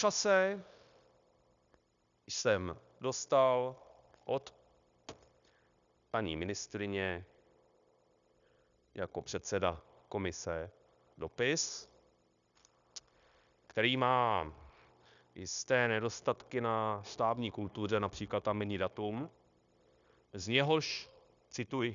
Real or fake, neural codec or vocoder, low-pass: fake; codec, 16 kHz, 0.9 kbps, LongCat-Audio-Codec; 7.2 kHz